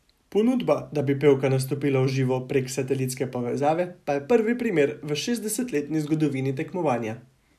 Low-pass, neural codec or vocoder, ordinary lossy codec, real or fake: 14.4 kHz; none; none; real